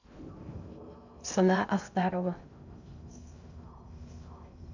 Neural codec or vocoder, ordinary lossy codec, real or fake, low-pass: codec, 16 kHz in and 24 kHz out, 0.6 kbps, FocalCodec, streaming, 2048 codes; none; fake; 7.2 kHz